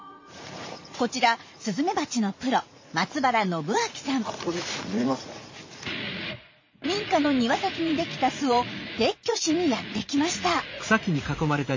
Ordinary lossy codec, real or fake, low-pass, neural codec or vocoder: MP3, 32 kbps; fake; 7.2 kHz; vocoder, 44.1 kHz, 80 mel bands, Vocos